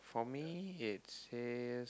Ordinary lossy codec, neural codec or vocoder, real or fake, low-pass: none; none; real; none